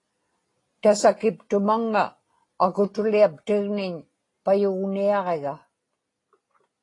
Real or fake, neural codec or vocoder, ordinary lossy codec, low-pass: real; none; AAC, 32 kbps; 10.8 kHz